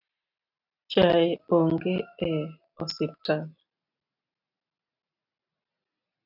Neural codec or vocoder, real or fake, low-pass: none; real; 5.4 kHz